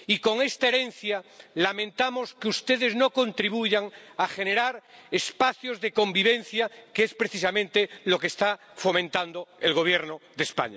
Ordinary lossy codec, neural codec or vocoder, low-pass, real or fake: none; none; none; real